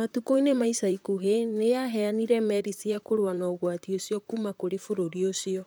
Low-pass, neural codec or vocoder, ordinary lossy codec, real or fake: none; vocoder, 44.1 kHz, 128 mel bands, Pupu-Vocoder; none; fake